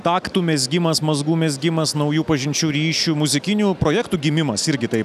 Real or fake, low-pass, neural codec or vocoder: real; 14.4 kHz; none